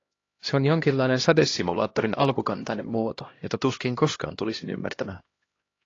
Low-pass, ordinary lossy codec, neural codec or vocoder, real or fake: 7.2 kHz; AAC, 32 kbps; codec, 16 kHz, 1 kbps, X-Codec, HuBERT features, trained on LibriSpeech; fake